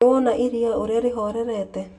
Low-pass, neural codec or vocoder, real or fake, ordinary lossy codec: 10.8 kHz; none; real; none